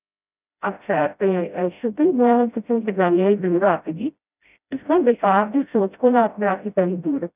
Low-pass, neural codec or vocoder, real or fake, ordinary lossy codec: 3.6 kHz; codec, 16 kHz, 0.5 kbps, FreqCodec, smaller model; fake; none